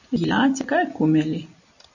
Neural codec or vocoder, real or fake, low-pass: none; real; 7.2 kHz